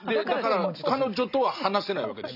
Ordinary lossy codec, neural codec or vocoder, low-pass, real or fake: none; none; 5.4 kHz; real